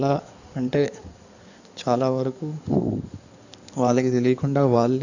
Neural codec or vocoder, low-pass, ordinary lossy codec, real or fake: codec, 16 kHz in and 24 kHz out, 2.2 kbps, FireRedTTS-2 codec; 7.2 kHz; none; fake